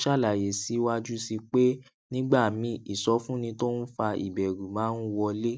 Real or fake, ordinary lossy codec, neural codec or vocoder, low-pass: real; none; none; none